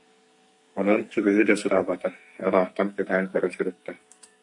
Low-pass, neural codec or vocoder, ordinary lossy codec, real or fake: 10.8 kHz; codec, 44.1 kHz, 2.6 kbps, SNAC; MP3, 48 kbps; fake